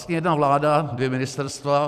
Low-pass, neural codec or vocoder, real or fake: 14.4 kHz; vocoder, 44.1 kHz, 128 mel bands every 256 samples, BigVGAN v2; fake